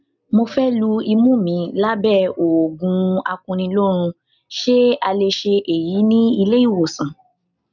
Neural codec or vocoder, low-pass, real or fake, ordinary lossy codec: none; 7.2 kHz; real; none